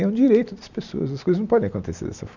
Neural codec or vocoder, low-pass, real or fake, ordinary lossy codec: none; 7.2 kHz; real; none